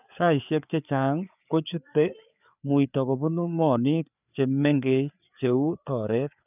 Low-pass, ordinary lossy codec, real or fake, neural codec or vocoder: 3.6 kHz; none; fake; codec, 16 kHz, 4 kbps, FreqCodec, larger model